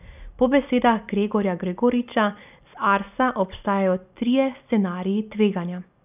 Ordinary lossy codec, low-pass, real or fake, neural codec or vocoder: none; 3.6 kHz; real; none